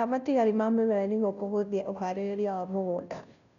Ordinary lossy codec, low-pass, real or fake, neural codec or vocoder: none; 7.2 kHz; fake; codec, 16 kHz, 0.5 kbps, FunCodec, trained on Chinese and English, 25 frames a second